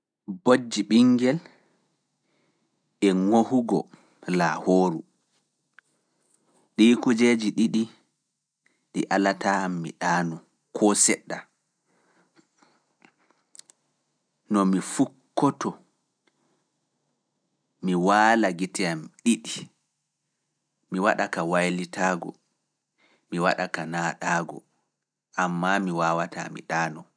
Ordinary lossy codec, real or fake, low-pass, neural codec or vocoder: none; real; 9.9 kHz; none